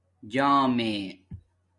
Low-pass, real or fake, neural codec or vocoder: 10.8 kHz; real; none